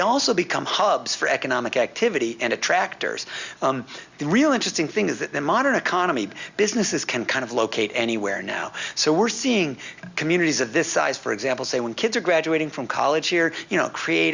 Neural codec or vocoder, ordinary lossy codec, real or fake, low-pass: none; Opus, 64 kbps; real; 7.2 kHz